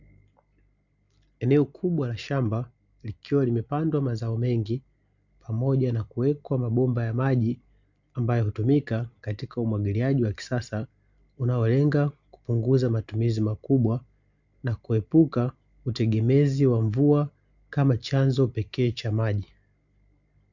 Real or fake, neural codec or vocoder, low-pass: real; none; 7.2 kHz